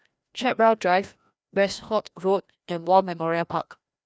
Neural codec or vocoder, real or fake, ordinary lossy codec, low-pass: codec, 16 kHz, 1 kbps, FreqCodec, larger model; fake; none; none